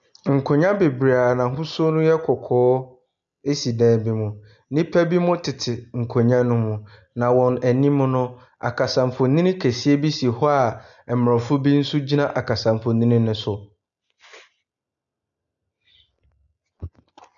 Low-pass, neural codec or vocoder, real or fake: 7.2 kHz; none; real